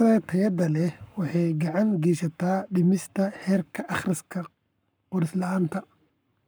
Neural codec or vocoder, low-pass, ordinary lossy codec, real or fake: codec, 44.1 kHz, 7.8 kbps, Pupu-Codec; none; none; fake